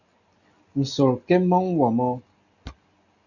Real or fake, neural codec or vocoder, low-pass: real; none; 7.2 kHz